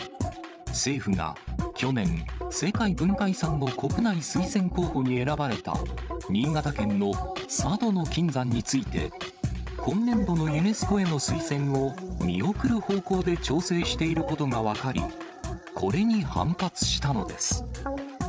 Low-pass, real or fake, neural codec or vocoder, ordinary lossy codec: none; fake; codec, 16 kHz, 8 kbps, FreqCodec, larger model; none